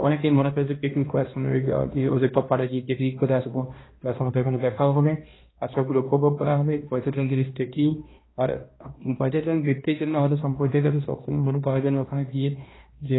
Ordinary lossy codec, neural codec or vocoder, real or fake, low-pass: AAC, 16 kbps; codec, 16 kHz, 1 kbps, X-Codec, HuBERT features, trained on balanced general audio; fake; 7.2 kHz